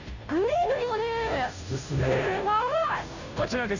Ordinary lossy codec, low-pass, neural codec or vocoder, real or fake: none; 7.2 kHz; codec, 16 kHz, 0.5 kbps, FunCodec, trained on Chinese and English, 25 frames a second; fake